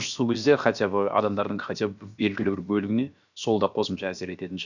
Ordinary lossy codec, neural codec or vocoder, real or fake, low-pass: none; codec, 16 kHz, about 1 kbps, DyCAST, with the encoder's durations; fake; 7.2 kHz